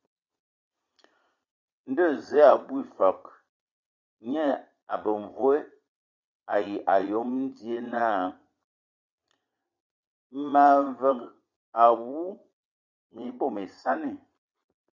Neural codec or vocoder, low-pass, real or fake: vocoder, 22.05 kHz, 80 mel bands, Vocos; 7.2 kHz; fake